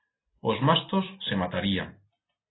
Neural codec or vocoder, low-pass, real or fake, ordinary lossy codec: none; 7.2 kHz; real; AAC, 16 kbps